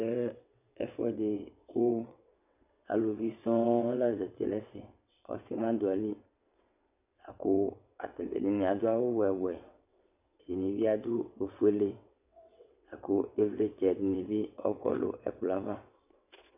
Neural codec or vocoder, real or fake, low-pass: vocoder, 22.05 kHz, 80 mel bands, WaveNeXt; fake; 3.6 kHz